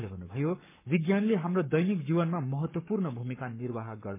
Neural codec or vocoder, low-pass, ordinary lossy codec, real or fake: codec, 44.1 kHz, 7.8 kbps, Pupu-Codec; 3.6 kHz; MP3, 32 kbps; fake